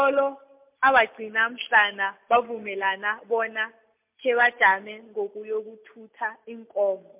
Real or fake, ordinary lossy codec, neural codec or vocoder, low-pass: real; MP3, 32 kbps; none; 3.6 kHz